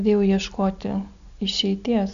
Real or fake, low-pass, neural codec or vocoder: real; 7.2 kHz; none